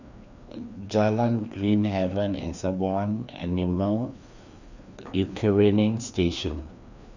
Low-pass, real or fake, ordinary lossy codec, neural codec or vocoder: 7.2 kHz; fake; none; codec, 16 kHz, 2 kbps, FreqCodec, larger model